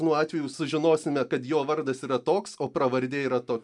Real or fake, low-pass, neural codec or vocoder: real; 10.8 kHz; none